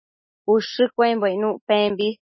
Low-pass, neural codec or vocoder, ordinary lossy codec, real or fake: 7.2 kHz; none; MP3, 24 kbps; real